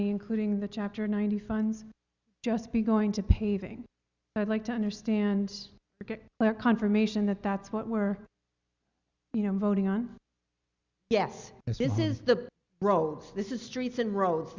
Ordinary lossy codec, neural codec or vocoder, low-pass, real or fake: Opus, 64 kbps; none; 7.2 kHz; real